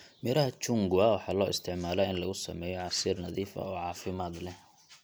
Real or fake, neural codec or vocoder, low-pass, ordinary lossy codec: real; none; none; none